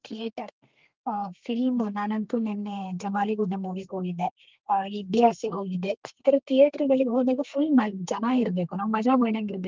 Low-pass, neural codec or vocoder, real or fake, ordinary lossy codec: 7.2 kHz; codec, 32 kHz, 1.9 kbps, SNAC; fake; Opus, 32 kbps